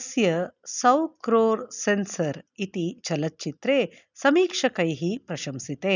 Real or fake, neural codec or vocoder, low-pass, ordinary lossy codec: real; none; 7.2 kHz; none